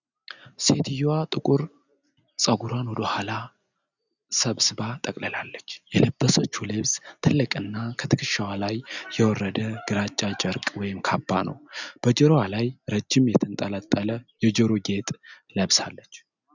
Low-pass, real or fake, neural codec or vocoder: 7.2 kHz; real; none